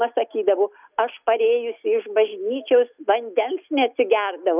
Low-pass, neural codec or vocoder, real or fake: 3.6 kHz; none; real